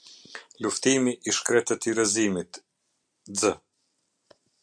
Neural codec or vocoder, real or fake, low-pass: none; real; 9.9 kHz